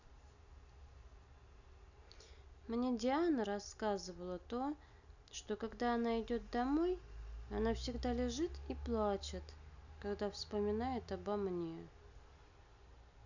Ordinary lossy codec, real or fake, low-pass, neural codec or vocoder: none; real; 7.2 kHz; none